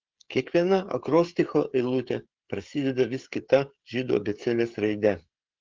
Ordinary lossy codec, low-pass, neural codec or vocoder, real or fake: Opus, 16 kbps; 7.2 kHz; codec, 16 kHz, 16 kbps, FreqCodec, smaller model; fake